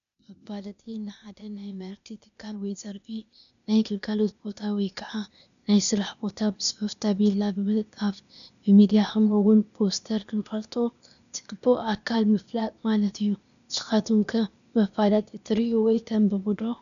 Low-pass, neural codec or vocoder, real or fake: 7.2 kHz; codec, 16 kHz, 0.8 kbps, ZipCodec; fake